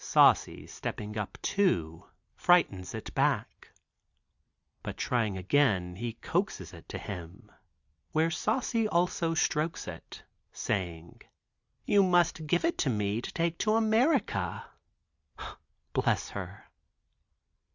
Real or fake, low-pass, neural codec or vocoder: real; 7.2 kHz; none